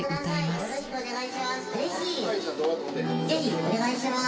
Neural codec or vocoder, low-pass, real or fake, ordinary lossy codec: none; none; real; none